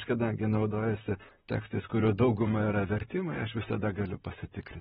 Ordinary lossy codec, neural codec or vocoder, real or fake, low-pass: AAC, 16 kbps; vocoder, 44.1 kHz, 128 mel bands, Pupu-Vocoder; fake; 19.8 kHz